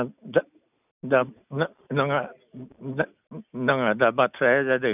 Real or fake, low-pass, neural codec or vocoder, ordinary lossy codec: real; 3.6 kHz; none; none